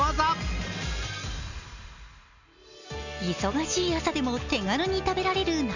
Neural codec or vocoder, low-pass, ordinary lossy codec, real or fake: none; 7.2 kHz; none; real